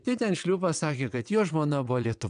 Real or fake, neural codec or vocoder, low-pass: fake; vocoder, 22.05 kHz, 80 mel bands, WaveNeXt; 9.9 kHz